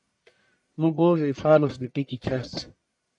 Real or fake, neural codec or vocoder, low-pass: fake; codec, 44.1 kHz, 1.7 kbps, Pupu-Codec; 10.8 kHz